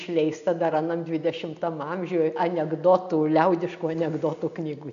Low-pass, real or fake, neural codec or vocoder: 7.2 kHz; real; none